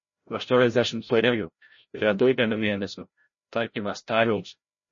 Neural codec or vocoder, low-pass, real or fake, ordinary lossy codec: codec, 16 kHz, 0.5 kbps, FreqCodec, larger model; 7.2 kHz; fake; MP3, 32 kbps